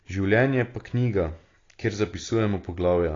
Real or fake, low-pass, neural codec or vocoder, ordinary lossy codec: real; 7.2 kHz; none; AAC, 32 kbps